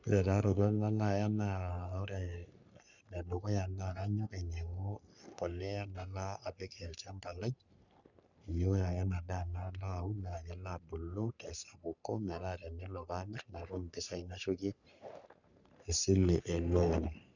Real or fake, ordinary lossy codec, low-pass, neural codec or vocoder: fake; none; 7.2 kHz; codec, 44.1 kHz, 3.4 kbps, Pupu-Codec